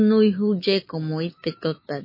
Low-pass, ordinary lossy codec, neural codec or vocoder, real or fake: 5.4 kHz; MP3, 24 kbps; autoencoder, 48 kHz, 128 numbers a frame, DAC-VAE, trained on Japanese speech; fake